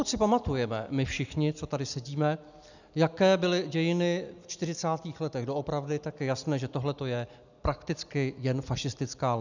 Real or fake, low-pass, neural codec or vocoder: real; 7.2 kHz; none